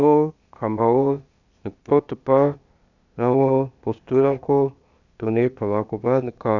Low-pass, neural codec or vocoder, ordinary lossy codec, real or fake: 7.2 kHz; codec, 16 kHz, 0.8 kbps, ZipCodec; none; fake